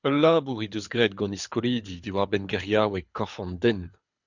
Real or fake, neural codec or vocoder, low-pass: fake; codec, 24 kHz, 6 kbps, HILCodec; 7.2 kHz